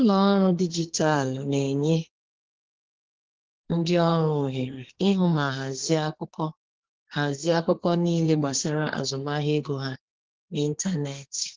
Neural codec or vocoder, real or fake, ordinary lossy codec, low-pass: codec, 32 kHz, 1.9 kbps, SNAC; fake; Opus, 16 kbps; 7.2 kHz